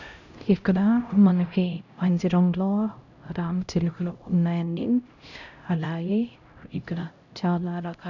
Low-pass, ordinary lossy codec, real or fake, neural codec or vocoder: 7.2 kHz; none; fake; codec, 16 kHz, 0.5 kbps, X-Codec, HuBERT features, trained on LibriSpeech